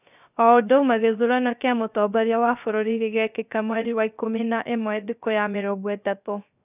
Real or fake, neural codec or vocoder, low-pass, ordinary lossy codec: fake; codec, 16 kHz, 0.3 kbps, FocalCodec; 3.6 kHz; none